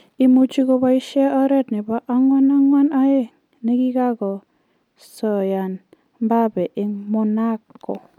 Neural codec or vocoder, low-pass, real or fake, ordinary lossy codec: none; 19.8 kHz; real; none